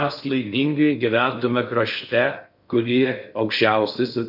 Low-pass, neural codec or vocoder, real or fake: 5.4 kHz; codec, 16 kHz in and 24 kHz out, 0.6 kbps, FocalCodec, streaming, 4096 codes; fake